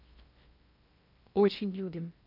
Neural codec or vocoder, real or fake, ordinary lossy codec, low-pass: codec, 16 kHz in and 24 kHz out, 0.6 kbps, FocalCodec, streaming, 4096 codes; fake; none; 5.4 kHz